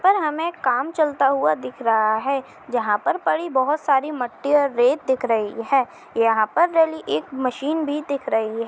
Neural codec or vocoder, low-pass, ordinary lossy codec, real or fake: none; none; none; real